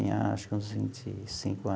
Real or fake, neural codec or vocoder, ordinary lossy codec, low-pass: real; none; none; none